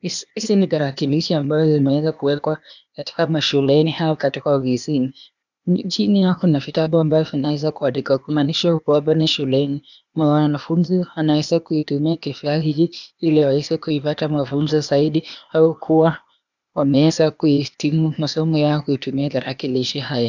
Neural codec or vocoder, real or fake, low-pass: codec, 16 kHz, 0.8 kbps, ZipCodec; fake; 7.2 kHz